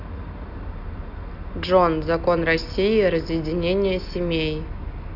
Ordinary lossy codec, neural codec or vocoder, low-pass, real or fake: none; none; 5.4 kHz; real